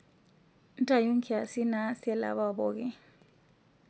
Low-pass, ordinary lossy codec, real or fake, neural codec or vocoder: none; none; real; none